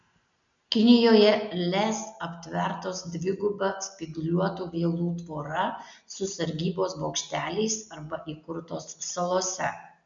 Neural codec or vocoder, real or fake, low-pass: none; real; 7.2 kHz